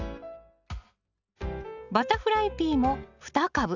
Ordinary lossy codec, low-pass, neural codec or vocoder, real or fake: none; 7.2 kHz; none; real